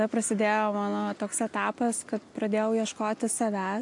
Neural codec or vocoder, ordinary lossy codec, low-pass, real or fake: none; AAC, 64 kbps; 10.8 kHz; real